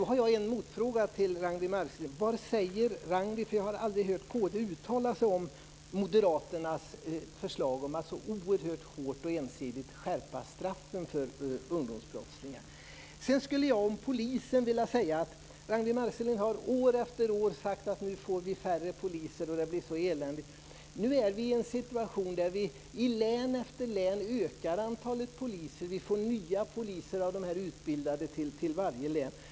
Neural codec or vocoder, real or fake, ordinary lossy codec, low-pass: none; real; none; none